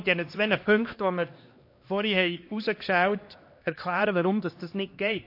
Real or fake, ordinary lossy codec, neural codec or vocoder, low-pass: fake; MP3, 32 kbps; codec, 16 kHz, 2 kbps, X-Codec, HuBERT features, trained on LibriSpeech; 5.4 kHz